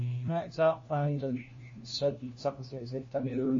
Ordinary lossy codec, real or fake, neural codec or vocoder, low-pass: MP3, 32 kbps; fake; codec, 16 kHz, 1 kbps, FunCodec, trained on LibriTTS, 50 frames a second; 7.2 kHz